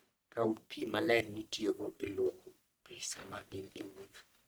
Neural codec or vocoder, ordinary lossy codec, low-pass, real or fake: codec, 44.1 kHz, 1.7 kbps, Pupu-Codec; none; none; fake